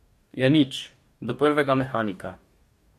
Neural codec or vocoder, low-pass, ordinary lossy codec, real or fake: codec, 44.1 kHz, 2.6 kbps, DAC; 14.4 kHz; MP3, 64 kbps; fake